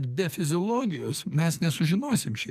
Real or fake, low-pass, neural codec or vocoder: fake; 14.4 kHz; codec, 44.1 kHz, 2.6 kbps, SNAC